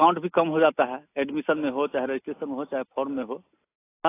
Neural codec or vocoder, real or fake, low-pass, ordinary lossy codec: none; real; 3.6 kHz; AAC, 24 kbps